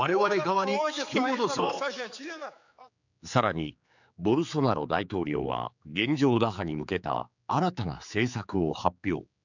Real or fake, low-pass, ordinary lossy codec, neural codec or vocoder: fake; 7.2 kHz; none; codec, 16 kHz, 4 kbps, X-Codec, HuBERT features, trained on general audio